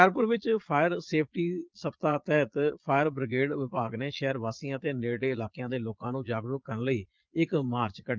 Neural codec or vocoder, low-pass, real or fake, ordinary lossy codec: vocoder, 22.05 kHz, 80 mel bands, Vocos; 7.2 kHz; fake; Opus, 24 kbps